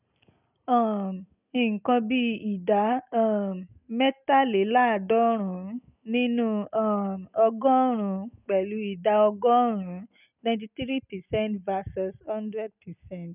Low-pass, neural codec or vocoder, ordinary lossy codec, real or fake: 3.6 kHz; none; none; real